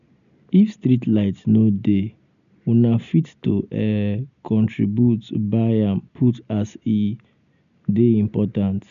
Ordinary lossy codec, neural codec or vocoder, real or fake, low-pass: none; none; real; 7.2 kHz